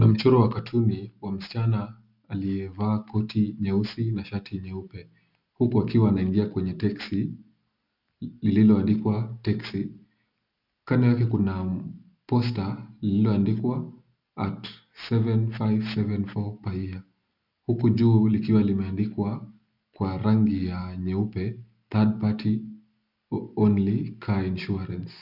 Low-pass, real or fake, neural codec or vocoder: 5.4 kHz; real; none